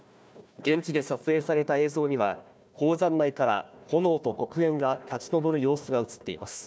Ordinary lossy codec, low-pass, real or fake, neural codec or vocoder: none; none; fake; codec, 16 kHz, 1 kbps, FunCodec, trained on Chinese and English, 50 frames a second